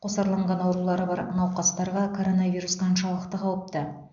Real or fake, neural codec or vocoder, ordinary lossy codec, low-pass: real; none; AAC, 64 kbps; 7.2 kHz